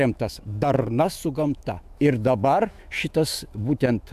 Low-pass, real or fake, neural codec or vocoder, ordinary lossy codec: 14.4 kHz; fake; autoencoder, 48 kHz, 128 numbers a frame, DAC-VAE, trained on Japanese speech; AAC, 96 kbps